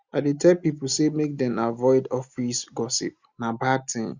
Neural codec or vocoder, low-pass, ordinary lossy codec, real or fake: none; 7.2 kHz; Opus, 64 kbps; real